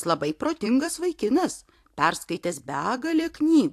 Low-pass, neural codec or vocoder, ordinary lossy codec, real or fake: 14.4 kHz; vocoder, 44.1 kHz, 128 mel bands every 256 samples, BigVGAN v2; AAC, 64 kbps; fake